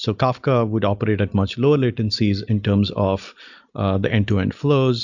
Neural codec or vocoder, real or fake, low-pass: none; real; 7.2 kHz